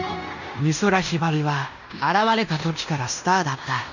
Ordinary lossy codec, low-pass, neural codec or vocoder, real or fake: none; 7.2 kHz; codec, 16 kHz in and 24 kHz out, 0.9 kbps, LongCat-Audio-Codec, fine tuned four codebook decoder; fake